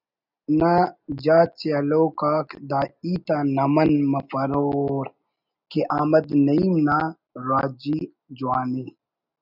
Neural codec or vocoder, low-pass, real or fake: none; 5.4 kHz; real